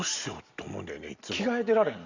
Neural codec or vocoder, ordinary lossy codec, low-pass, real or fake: none; Opus, 64 kbps; 7.2 kHz; real